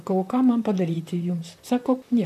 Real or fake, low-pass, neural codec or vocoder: fake; 14.4 kHz; vocoder, 44.1 kHz, 128 mel bands, Pupu-Vocoder